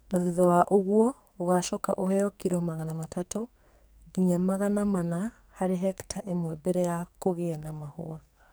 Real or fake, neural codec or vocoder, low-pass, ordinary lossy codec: fake; codec, 44.1 kHz, 2.6 kbps, SNAC; none; none